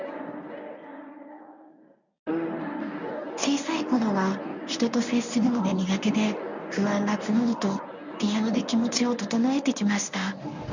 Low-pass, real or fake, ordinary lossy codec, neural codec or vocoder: 7.2 kHz; fake; none; codec, 24 kHz, 0.9 kbps, WavTokenizer, medium speech release version 1